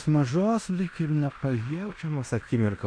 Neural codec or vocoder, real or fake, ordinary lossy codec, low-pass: codec, 16 kHz in and 24 kHz out, 0.9 kbps, LongCat-Audio-Codec, fine tuned four codebook decoder; fake; MP3, 96 kbps; 9.9 kHz